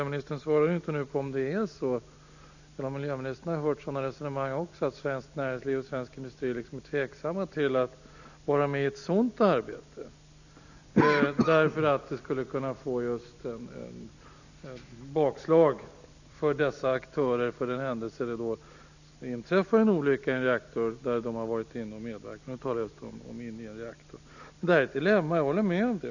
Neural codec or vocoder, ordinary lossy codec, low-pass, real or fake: none; none; 7.2 kHz; real